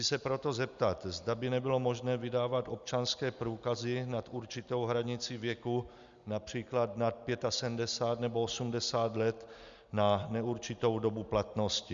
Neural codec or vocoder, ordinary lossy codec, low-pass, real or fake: none; Opus, 64 kbps; 7.2 kHz; real